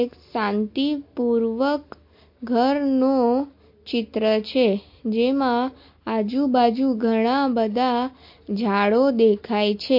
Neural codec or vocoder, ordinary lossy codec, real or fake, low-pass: none; MP3, 32 kbps; real; 5.4 kHz